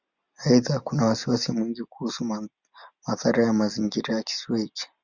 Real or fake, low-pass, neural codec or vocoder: real; 7.2 kHz; none